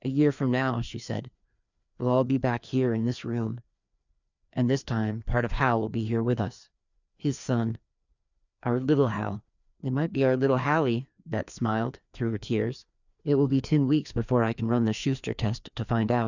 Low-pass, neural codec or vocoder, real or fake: 7.2 kHz; codec, 16 kHz, 2 kbps, FreqCodec, larger model; fake